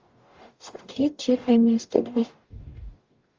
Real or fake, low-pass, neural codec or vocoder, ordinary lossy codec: fake; 7.2 kHz; codec, 44.1 kHz, 0.9 kbps, DAC; Opus, 32 kbps